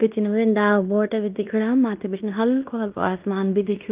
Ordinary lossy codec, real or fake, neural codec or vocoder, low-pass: Opus, 32 kbps; fake; codec, 16 kHz in and 24 kHz out, 0.9 kbps, LongCat-Audio-Codec, fine tuned four codebook decoder; 3.6 kHz